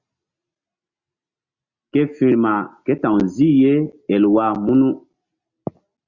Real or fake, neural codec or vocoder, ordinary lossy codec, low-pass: real; none; Opus, 64 kbps; 7.2 kHz